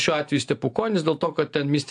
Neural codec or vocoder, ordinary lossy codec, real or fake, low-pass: none; AAC, 64 kbps; real; 9.9 kHz